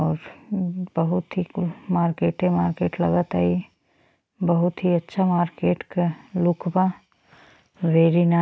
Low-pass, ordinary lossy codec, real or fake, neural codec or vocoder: none; none; real; none